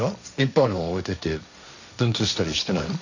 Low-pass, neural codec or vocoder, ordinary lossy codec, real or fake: 7.2 kHz; codec, 16 kHz, 1.1 kbps, Voila-Tokenizer; none; fake